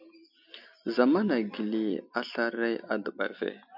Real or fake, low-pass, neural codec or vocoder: real; 5.4 kHz; none